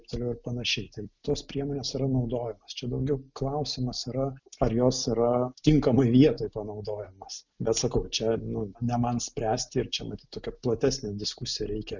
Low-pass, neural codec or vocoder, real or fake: 7.2 kHz; none; real